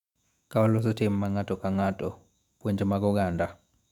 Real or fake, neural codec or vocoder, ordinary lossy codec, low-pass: fake; autoencoder, 48 kHz, 128 numbers a frame, DAC-VAE, trained on Japanese speech; MP3, 96 kbps; 19.8 kHz